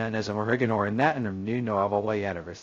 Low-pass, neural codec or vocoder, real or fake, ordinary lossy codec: 7.2 kHz; codec, 16 kHz, 0.2 kbps, FocalCodec; fake; AAC, 48 kbps